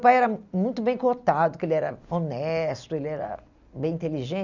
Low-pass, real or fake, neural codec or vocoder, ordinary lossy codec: 7.2 kHz; real; none; none